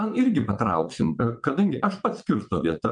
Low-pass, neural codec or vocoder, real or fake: 9.9 kHz; vocoder, 22.05 kHz, 80 mel bands, WaveNeXt; fake